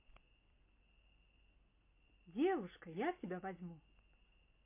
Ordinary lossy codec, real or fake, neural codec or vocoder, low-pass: MP3, 24 kbps; real; none; 3.6 kHz